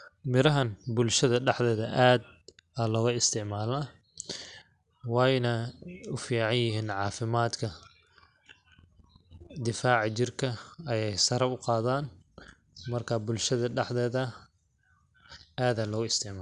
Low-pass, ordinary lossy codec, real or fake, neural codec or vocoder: 10.8 kHz; none; real; none